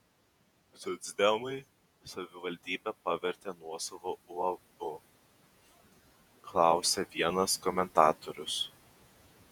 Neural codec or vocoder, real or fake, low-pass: vocoder, 48 kHz, 128 mel bands, Vocos; fake; 19.8 kHz